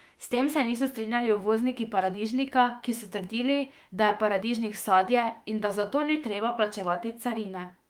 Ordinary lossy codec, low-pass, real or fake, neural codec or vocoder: Opus, 32 kbps; 19.8 kHz; fake; autoencoder, 48 kHz, 32 numbers a frame, DAC-VAE, trained on Japanese speech